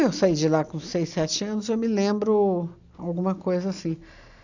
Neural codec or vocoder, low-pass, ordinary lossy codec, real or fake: none; 7.2 kHz; none; real